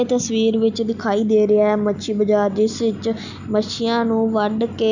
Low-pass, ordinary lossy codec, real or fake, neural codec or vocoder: 7.2 kHz; none; real; none